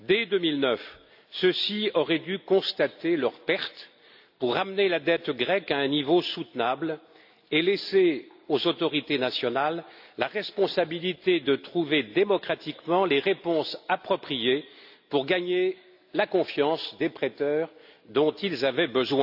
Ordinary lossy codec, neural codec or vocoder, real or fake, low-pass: AAC, 48 kbps; none; real; 5.4 kHz